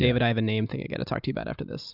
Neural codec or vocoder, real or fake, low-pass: none; real; 5.4 kHz